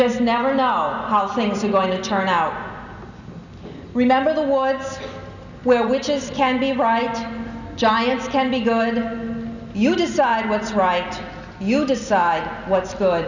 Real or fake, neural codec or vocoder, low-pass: real; none; 7.2 kHz